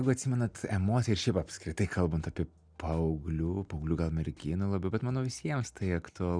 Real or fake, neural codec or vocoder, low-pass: real; none; 9.9 kHz